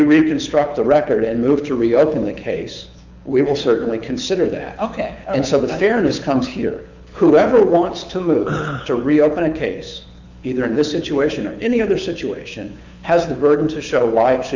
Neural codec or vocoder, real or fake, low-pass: codec, 24 kHz, 6 kbps, HILCodec; fake; 7.2 kHz